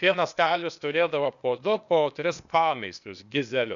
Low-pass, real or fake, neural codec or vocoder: 7.2 kHz; fake; codec, 16 kHz, 0.8 kbps, ZipCodec